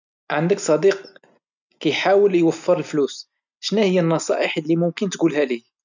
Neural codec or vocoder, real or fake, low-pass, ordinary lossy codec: none; real; 7.2 kHz; none